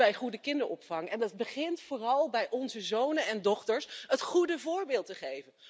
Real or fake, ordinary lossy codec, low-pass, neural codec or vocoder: real; none; none; none